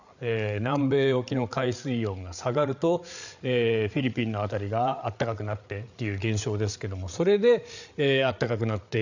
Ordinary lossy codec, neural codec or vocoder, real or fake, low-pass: none; codec, 16 kHz, 8 kbps, FreqCodec, larger model; fake; 7.2 kHz